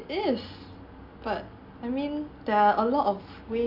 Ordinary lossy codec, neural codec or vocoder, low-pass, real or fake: none; none; 5.4 kHz; real